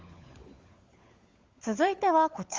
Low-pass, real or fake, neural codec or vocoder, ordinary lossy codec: 7.2 kHz; fake; codec, 16 kHz, 16 kbps, FunCodec, trained on LibriTTS, 50 frames a second; Opus, 32 kbps